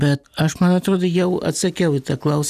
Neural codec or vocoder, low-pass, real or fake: none; 14.4 kHz; real